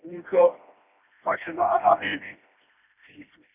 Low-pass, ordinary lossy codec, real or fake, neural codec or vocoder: 3.6 kHz; none; fake; codec, 16 kHz, 1 kbps, FreqCodec, smaller model